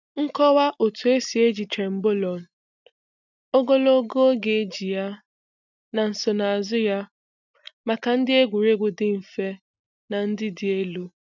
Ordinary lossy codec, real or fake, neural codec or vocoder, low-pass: none; real; none; 7.2 kHz